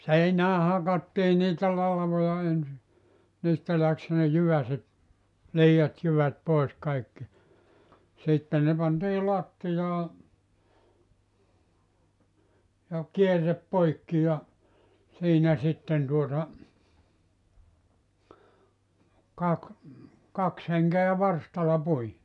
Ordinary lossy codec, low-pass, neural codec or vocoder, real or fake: none; 10.8 kHz; none; real